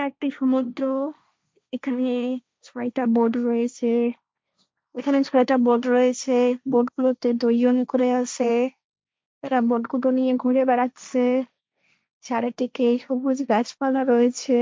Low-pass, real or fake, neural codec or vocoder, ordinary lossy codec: none; fake; codec, 16 kHz, 1.1 kbps, Voila-Tokenizer; none